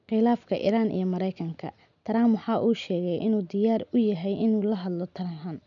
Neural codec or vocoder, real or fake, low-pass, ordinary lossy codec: none; real; 7.2 kHz; none